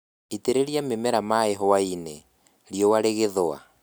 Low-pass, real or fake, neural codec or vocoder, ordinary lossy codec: none; real; none; none